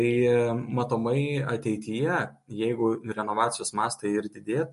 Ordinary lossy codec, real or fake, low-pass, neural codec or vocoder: MP3, 48 kbps; real; 14.4 kHz; none